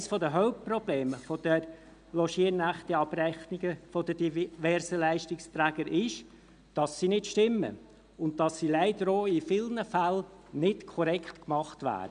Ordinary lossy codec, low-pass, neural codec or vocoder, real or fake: none; 9.9 kHz; none; real